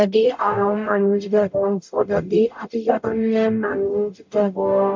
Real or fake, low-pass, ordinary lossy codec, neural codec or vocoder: fake; 7.2 kHz; MP3, 64 kbps; codec, 44.1 kHz, 0.9 kbps, DAC